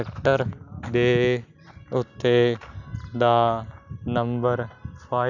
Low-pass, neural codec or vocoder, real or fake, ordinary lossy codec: 7.2 kHz; none; real; none